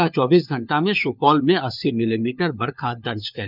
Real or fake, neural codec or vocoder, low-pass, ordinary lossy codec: fake; codec, 16 kHz, 4 kbps, FunCodec, trained on LibriTTS, 50 frames a second; 5.4 kHz; none